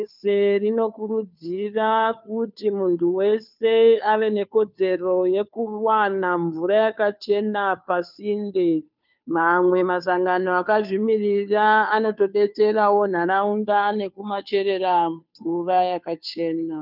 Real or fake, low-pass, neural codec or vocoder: fake; 5.4 kHz; codec, 16 kHz, 2 kbps, FunCodec, trained on Chinese and English, 25 frames a second